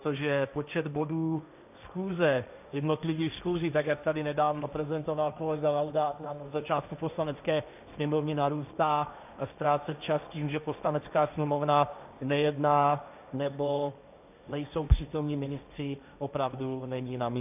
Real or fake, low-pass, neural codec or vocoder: fake; 3.6 kHz; codec, 16 kHz, 1.1 kbps, Voila-Tokenizer